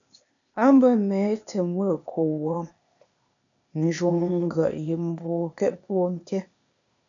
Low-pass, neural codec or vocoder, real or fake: 7.2 kHz; codec, 16 kHz, 0.8 kbps, ZipCodec; fake